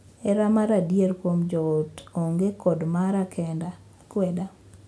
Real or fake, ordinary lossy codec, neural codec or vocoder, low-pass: real; none; none; none